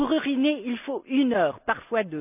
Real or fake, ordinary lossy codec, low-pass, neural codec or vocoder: real; none; 3.6 kHz; none